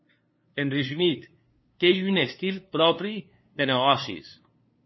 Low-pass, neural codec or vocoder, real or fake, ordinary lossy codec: 7.2 kHz; codec, 16 kHz, 2 kbps, FunCodec, trained on LibriTTS, 25 frames a second; fake; MP3, 24 kbps